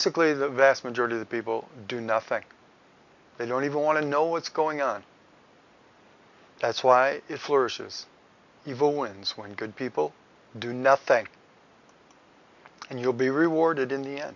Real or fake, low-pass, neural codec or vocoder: real; 7.2 kHz; none